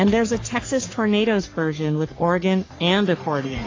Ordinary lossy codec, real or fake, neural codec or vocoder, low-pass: AAC, 32 kbps; fake; codec, 44.1 kHz, 3.4 kbps, Pupu-Codec; 7.2 kHz